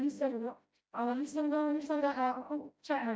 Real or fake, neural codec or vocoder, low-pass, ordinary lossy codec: fake; codec, 16 kHz, 0.5 kbps, FreqCodec, smaller model; none; none